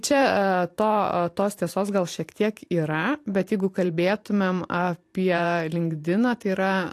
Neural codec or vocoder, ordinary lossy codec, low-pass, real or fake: vocoder, 44.1 kHz, 128 mel bands every 512 samples, BigVGAN v2; AAC, 64 kbps; 14.4 kHz; fake